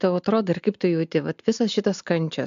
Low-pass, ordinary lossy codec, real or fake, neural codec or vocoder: 7.2 kHz; MP3, 64 kbps; real; none